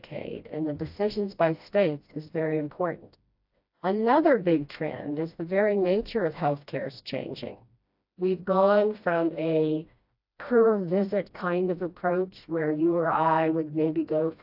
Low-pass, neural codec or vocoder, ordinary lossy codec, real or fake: 5.4 kHz; codec, 16 kHz, 1 kbps, FreqCodec, smaller model; AAC, 48 kbps; fake